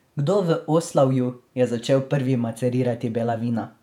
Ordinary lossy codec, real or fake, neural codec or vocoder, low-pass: none; fake; vocoder, 48 kHz, 128 mel bands, Vocos; 19.8 kHz